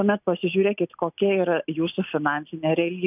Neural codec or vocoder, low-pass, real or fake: none; 3.6 kHz; real